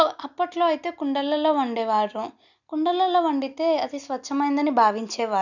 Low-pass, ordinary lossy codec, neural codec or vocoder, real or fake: 7.2 kHz; none; none; real